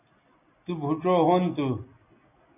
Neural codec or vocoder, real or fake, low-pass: none; real; 3.6 kHz